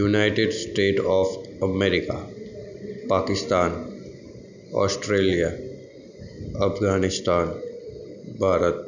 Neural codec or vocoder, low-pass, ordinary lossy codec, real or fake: none; 7.2 kHz; none; real